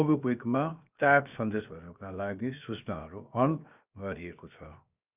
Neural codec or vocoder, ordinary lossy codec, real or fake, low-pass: codec, 16 kHz, 0.7 kbps, FocalCodec; none; fake; 3.6 kHz